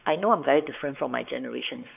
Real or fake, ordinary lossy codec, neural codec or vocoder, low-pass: fake; none; codec, 16 kHz, 4 kbps, X-Codec, WavLM features, trained on Multilingual LibriSpeech; 3.6 kHz